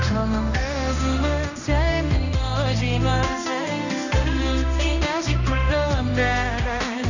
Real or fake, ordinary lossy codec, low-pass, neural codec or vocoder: fake; none; 7.2 kHz; codec, 16 kHz, 1 kbps, X-Codec, HuBERT features, trained on general audio